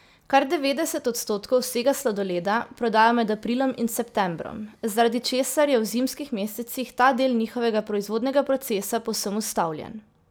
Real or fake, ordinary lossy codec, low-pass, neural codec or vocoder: real; none; none; none